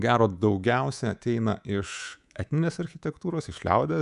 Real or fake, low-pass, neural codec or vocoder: fake; 10.8 kHz; codec, 24 kHz, 3.1 kbps, DualCodec